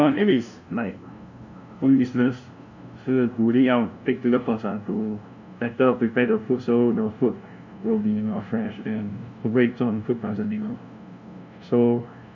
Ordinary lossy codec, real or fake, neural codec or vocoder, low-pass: none; fake; codec, 16 kHz, 0.5 kbps, FunCodec, trained on LibriTTS, 25 frames a second; 7.2 kHz